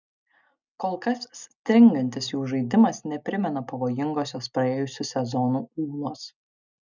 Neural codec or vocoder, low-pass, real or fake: none; 7.2 kHz; real